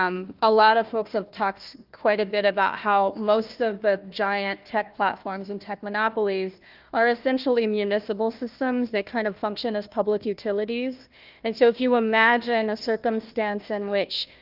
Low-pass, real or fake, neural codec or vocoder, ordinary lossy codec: 5.4 kHz; fake; codec, 16 kHz, 1 kbps, FunCodec, trained on Chinese and English, 50 frames a second; Opus, 24 kbps